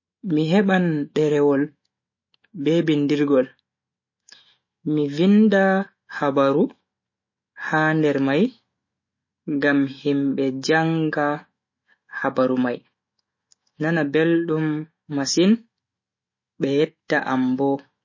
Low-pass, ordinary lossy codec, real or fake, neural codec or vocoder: 7.2 kHz; MP3, 32 kbps; fake; autoencoder, 48 kHz, 128 numbers a frame, DAC-VAE, trained on Japanese speech